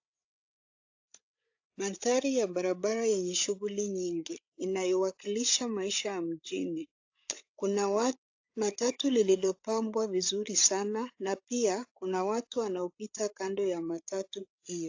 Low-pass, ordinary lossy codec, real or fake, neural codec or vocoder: 7.2 kHz; AAC, 48 kbps; fake; codec, 16 kHz, 8 kbps, FreqCodec, larger model